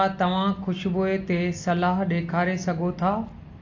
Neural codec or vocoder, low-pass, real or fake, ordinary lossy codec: none; 7.2 kHz; real; AAC, 48 kbps